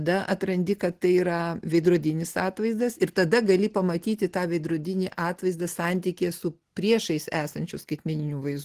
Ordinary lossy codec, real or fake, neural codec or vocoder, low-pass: Opus, 16 kbps; real; none; 14.4 kHz